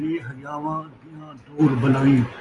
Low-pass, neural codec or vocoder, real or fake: 10.8 kHz; none; real